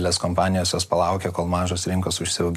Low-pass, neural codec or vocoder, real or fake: 14.4 kHz; none; real